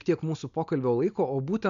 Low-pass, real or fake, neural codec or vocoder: 7.2 kHz; real; none